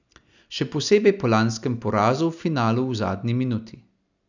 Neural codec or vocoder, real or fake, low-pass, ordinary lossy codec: none; real; 7.2 kHz; none